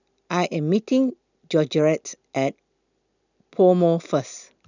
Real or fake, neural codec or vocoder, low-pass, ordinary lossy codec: real; none; 7.2 kHz; none